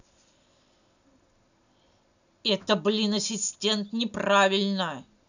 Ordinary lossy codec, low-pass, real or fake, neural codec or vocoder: none; 7.2 kHz; real; none